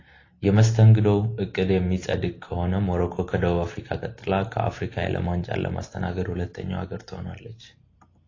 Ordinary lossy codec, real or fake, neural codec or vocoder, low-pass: AAC, 32 kbps; real; none; 7.2 kHz